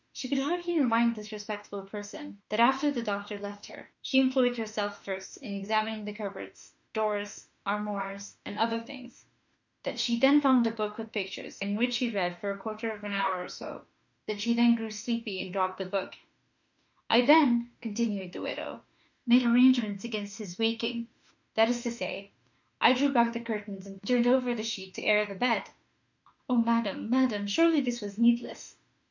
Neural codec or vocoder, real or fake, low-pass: autoencoder, 48 kHz, 32 numbers a frame, DAC-VAE, trained on Japanese speech; fake; 7.2 kHz